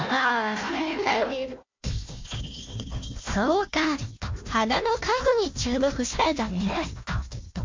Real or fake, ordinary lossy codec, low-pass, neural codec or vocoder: fake; MP3, 48 kbps; 7.2 kHz; codec, 16 kHz, 1 kbps, FunCodec, trained on Chinese and English, 50 frames a second